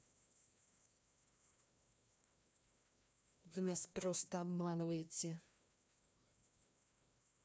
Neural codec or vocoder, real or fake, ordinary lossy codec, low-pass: codec, 16 kHz, 1 kbps, FreqCodec, larger model; fake; none; none